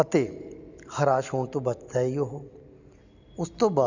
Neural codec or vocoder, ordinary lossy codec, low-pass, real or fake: none; none; 7.2 kHz; real